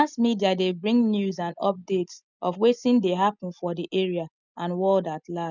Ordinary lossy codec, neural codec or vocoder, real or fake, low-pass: none; none; real; 7.2 kHz